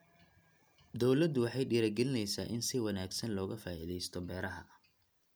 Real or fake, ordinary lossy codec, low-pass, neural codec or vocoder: real; none; none; none